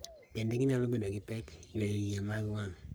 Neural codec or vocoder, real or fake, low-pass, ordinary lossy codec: codec, 44.1 kHz, 3.4 kbps, Pupu-Codec; fake; none; none